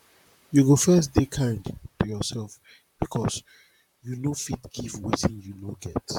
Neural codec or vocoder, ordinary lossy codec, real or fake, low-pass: vocoder, 44.1 kHz, 128 mel bands, Pupu-Vocoder; none; fake; 19.8 kHz